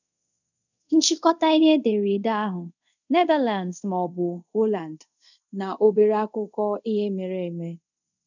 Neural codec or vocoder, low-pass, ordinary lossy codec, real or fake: codec, 24 kHz, 0.5 kbps, DualCodec; 7.2 kHz; none; fake